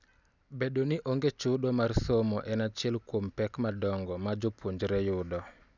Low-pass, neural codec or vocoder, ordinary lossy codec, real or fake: 7.2 kHz; none; none; real